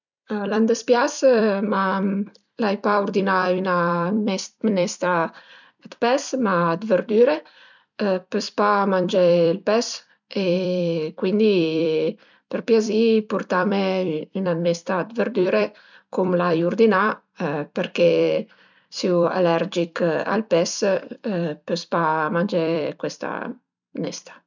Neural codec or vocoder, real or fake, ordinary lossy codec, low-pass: vocoder, 44.1 kHz, 128 mel bands every 256 samples, BigVGAN v2; fake; none; 7.2 kHz